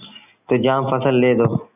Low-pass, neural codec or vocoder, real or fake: 3.6 kHz; none; real